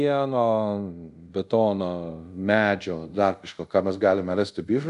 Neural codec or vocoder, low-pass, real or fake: codec, 24 kHz, 0.5 kbps, DualCodec; 10.8 kHz; fake